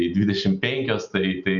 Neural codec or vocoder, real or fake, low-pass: none; real; 7.2 kHz